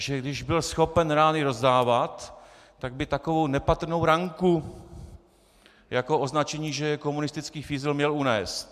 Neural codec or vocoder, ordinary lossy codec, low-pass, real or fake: none; MP3, 96 kbps; 14.4 kHz; real